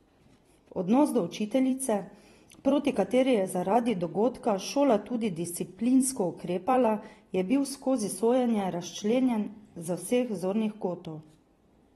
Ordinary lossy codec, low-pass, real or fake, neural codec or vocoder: AAC, 32 kbps; 19.8 kHz; fake; vocoder, 44.1 kHz, 128 mel bands every 256 samples, BigVGAN v2